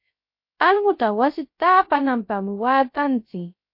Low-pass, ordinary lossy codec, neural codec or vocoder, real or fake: 5.4 kHz; MP3, 32 kbps; codec, 16 kHz, 0.3 kbps, FocalCodec; fake